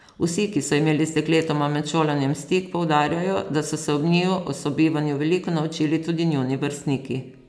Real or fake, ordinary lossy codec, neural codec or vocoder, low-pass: real; none; none; none